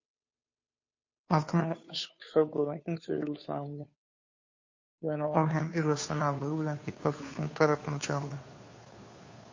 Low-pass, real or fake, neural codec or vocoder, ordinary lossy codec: 7.2 kHz; fake; codec, 16 kHz, 2 kbps, FunCodec, trained on Chinese and English, 25 frames a second; MP3, 32 kbps